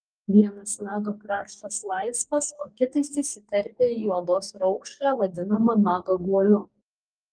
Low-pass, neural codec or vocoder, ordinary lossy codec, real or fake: 9.9 kHz; codec, 44.1 kHz, 2.6 kbps, DAC; Opus, 32 kbps; fake